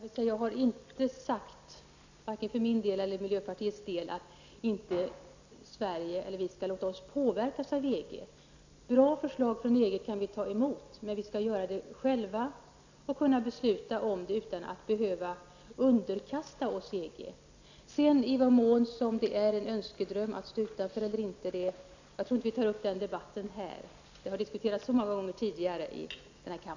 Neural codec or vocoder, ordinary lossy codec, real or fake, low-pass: none; Opus, 64 kbps; real; 7.2 kHz